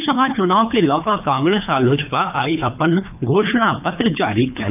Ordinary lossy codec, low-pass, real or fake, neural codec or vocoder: none; 3.6 kHz; fake; codec, 24 kHz, 3 kbps, HILCodec